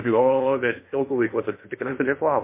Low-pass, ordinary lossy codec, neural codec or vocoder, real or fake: 3.6 kHz; MP3, 24 kbps; codec, 16 kHz in and 24 kHz out, 0.6 kbps, FocalCodec, streaming, 2048 codes; fake